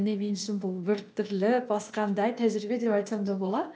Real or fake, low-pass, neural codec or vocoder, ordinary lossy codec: fake; none; codec, 16 kHz, 0.8 kbps, ZipCodec; none